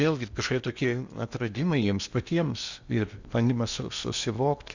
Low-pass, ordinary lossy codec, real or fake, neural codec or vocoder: 7.2 kHz; Opus, 64 kbps; fake; codec, 16 kHz in and 24 kHz out, 0.8 kbps, FocalCodec, streaming, 65536 codes